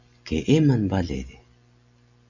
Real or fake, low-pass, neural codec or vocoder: real; 7.2 kHz; none